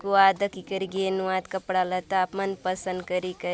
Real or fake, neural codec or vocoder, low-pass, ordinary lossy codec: real; none; none; none